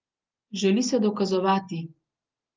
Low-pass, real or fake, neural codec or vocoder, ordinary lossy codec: 7.2 kHz; real; none; Opus, 32 kbps